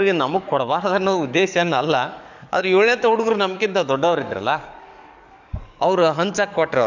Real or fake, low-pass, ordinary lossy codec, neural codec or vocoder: fake; 7.2 kHz; none; codec, 44.1 kHz, 7.8 kbps, DAC